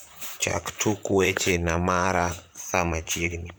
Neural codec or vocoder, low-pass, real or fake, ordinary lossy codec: vocoder, 44.1 kHz, 128 mel bands, Pupu-Vocoder; none; fake; none